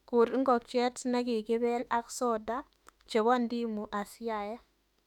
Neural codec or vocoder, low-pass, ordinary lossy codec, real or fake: autoencoder, 48 kHz, 32 numbers a frame, DAC-VAE, trained on Japanese speech; 19.8 kHz; none; fake